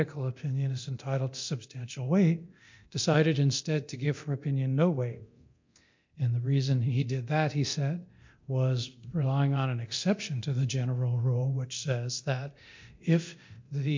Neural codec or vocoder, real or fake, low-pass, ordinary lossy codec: codec, 24 kHz, 0.9 kbps, DualCodec; fake; 7.2 kHz; MP3, 48 kbps